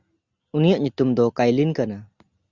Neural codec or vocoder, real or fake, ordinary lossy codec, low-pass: none; real; Opus, 64 kbps; 7.2 kHz